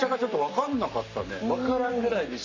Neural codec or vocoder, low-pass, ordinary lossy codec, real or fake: codec, 44.1 kHz, 7.8 kbps, Pupu-Codec; 7.2 kHz; none; fake